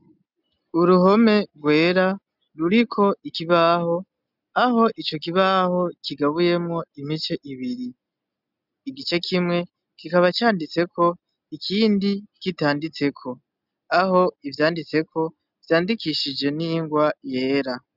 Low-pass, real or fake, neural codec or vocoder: 5.4 kHz; real; none